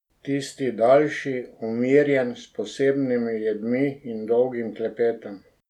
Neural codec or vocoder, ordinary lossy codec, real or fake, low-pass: none; none; real; 19.8 kHz